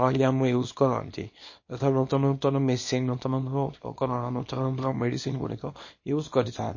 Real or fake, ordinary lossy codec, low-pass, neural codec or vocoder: fake; MP3, 32 kbps; 7.2 kHz; codec, 24 kHz, 0.9 kbps, WavTokenizer, small release